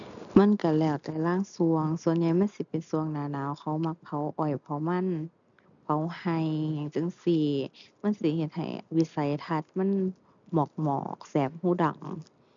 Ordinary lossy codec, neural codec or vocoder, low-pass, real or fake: none; none; 7.2 kHz; real